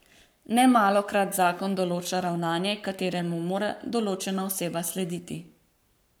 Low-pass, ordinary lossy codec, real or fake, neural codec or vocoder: none; none; fake; codec, 44.1 kHz, 7.8 kbps, Pupu-Codec